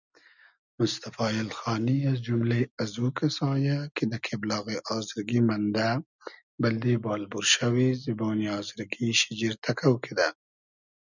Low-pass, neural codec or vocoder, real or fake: 7.2 kHz; none; real